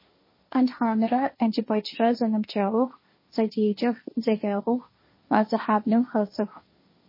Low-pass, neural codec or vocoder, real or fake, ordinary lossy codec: 5.4 kHz; codec, 16 kHz, 1.1 kbps, Voila-Tokenizer; fake; MP3, 24 kbps